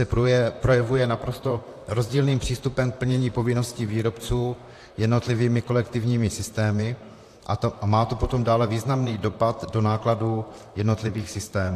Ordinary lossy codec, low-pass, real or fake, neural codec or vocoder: AAC, 64 kbps; 14.4 kHz; fake; vocoder, 44.1 kHz, 128 mel bands, Pupu-Vocoder